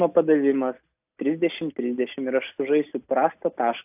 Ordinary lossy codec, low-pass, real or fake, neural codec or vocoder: AAC, 32 kbps; 3.6 kHz; real; none